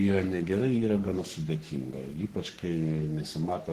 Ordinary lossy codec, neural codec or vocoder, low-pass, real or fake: Opus, 16 kbps; codec, 44.1 kHz, 3.4 kbps, Pupu-Codec; 14.4 kHz; fake